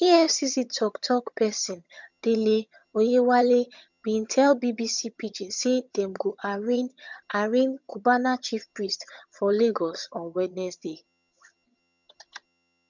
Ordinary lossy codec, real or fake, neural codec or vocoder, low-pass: none; fake; vocoder, 22.05 kHz, 80 mel bands, HiFi-GAN; 7.2 kHz